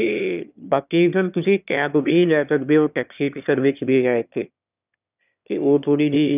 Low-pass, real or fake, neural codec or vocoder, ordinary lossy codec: 3.6 kHz; fake; autoencoder, 22.05 kHz, a latent of 192 numbers a frame, VITS, trained on one speaker; none